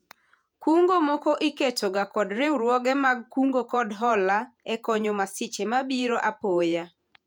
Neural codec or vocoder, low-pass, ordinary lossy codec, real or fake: vocoder, 48 kHz, 128 mel bands, Vocos; 19.8 kHz; none; fake